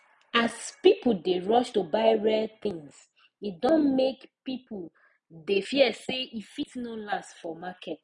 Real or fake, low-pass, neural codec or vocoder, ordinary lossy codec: real; 10.8 kHz; none; none